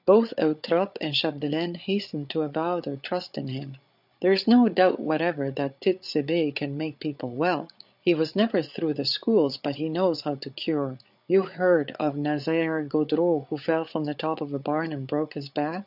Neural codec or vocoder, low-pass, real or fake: codec, 16 kHz, 8 kbps, FreqCodec, larger model; 5.4 kHz; fake